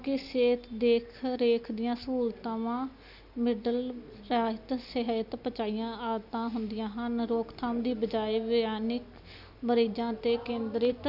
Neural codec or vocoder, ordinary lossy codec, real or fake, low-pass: none; none; real; 5.4 kHz